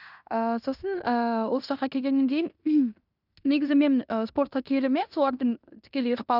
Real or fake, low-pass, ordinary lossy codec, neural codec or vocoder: fake; 5.4 kHz; none; codec, 16 kHz in and 24 kHz out, 0.9 kbps, LongCat-Audio-Codec, fine tuned four codebook decoder